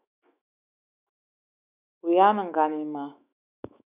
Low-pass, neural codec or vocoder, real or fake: 3.6 kHz; autoencoder, 48 kHz, 128 numbers a frame, DAC-VAE, trained on Japanese speech; fake